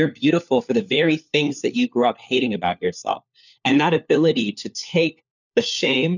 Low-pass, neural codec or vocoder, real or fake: 7.2 kHz; codec, 16 kHz, 4 kbps, FunCodec, trained on LibriTTS, 50 frames a second; fake